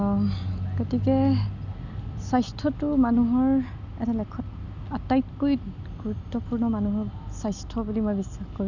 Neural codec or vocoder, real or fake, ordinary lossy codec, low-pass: none; real; none; 7.2 kHz